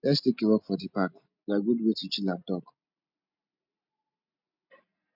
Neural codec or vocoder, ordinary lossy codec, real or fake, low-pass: none; none; real; 5.4 kHz